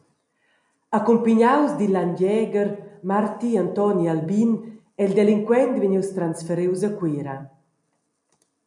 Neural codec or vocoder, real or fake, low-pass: none; real; 10.8 kHz